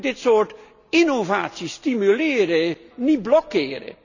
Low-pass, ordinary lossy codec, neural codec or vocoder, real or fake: 7.2 kHz; none; none; real